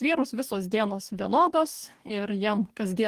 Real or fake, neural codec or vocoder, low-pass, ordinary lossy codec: fake; codec, 44.1 kHz, 2.6 kbps, DAC; 14.4 kHz; Opus, 24 kbps